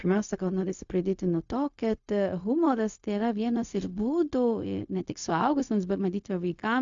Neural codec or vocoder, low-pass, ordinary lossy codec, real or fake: codec, 16 kHz, 0.4 kbps, LongCat-Audio-Codec; 7.2 kHz; AAC, 64 kbps; fake